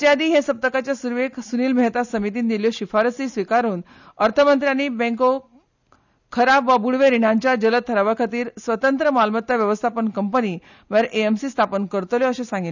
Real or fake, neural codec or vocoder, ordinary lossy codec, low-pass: real; none; none; 7.2 kHz